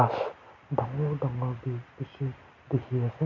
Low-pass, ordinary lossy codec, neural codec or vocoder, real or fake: 7.2 kHz; none; none; real